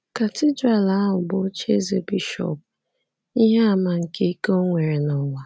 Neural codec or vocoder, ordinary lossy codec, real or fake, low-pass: none; none; real; none